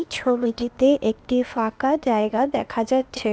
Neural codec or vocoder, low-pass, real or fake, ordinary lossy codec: codec, 16 kHz, 0.8 kbps, ZipCodec; none; fake; none